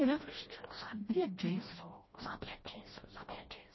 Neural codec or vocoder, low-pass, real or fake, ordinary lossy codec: codec, 16 kHz, 1 kbps, FreqCodec, smaller model; 7.2 kHz; fake; MP3, 24 kbps